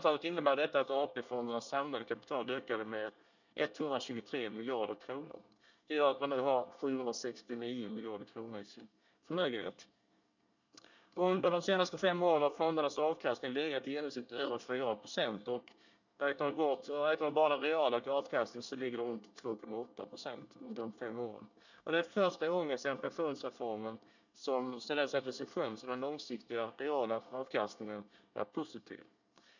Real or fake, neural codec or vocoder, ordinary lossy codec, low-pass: fake; codec, 24 kHz, 1 kbps, SNAC; none; 7.2 kHz